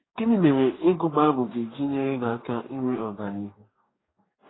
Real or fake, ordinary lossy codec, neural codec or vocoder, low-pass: fake; AAC, 16 kbps; codec, 44.1 kHz, 2.6 kbps, DAC; 7.2 kHz